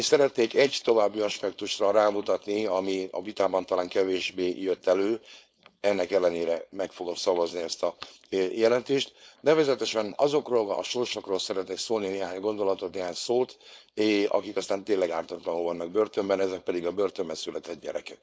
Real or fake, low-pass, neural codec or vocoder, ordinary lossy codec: fake; none; codec, 16 kHz, 4.8 kbps, FACodec; none